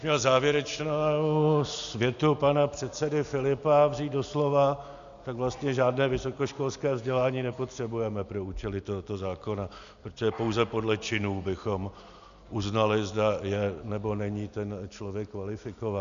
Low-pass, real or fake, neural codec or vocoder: 7.2 kHz; real; none